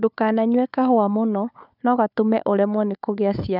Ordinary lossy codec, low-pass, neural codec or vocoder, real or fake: none; 5.4 kHz; codec, 16 kHz, 4 kbps, FunCodec, trained on Chinese and English, 50 frames a second; fake